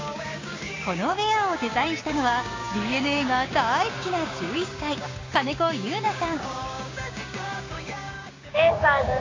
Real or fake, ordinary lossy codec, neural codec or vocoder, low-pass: fake; AAC, 32 kbps; codec, 16 kHz, 6 kbps, DAC; 7.2 kHz